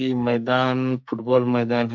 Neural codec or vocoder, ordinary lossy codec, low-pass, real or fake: codec, 44.1 kHz, 2.6 kbps, SNAC; none; 7.2 kHz; fake